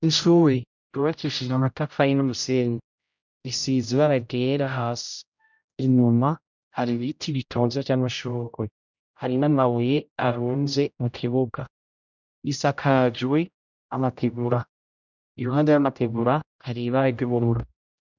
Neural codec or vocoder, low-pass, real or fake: codec, 16 kHz, 0.5 kbps, X-Codec, HuBERT features, trained on general audio; 7.2 kHz; fake